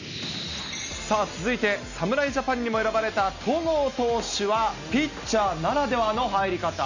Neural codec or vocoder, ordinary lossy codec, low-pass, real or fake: none; none; 7.2 kHz; real